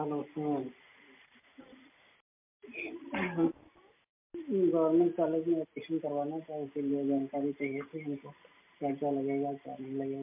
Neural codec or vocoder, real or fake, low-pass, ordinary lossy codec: none; real; 3.6 kHz; MP3, 32 kbps